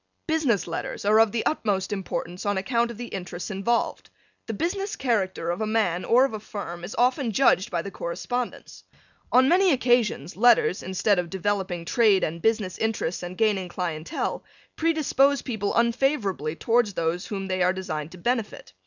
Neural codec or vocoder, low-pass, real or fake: none; 7.2 kHz; real